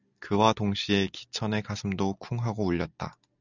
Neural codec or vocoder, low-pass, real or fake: none; 7.2 kHz; real